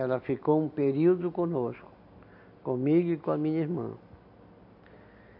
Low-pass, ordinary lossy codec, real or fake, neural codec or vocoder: 5.4 kHz; none; real; none